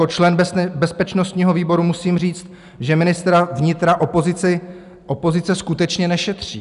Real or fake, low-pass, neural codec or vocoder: real; 10.8 kHz; none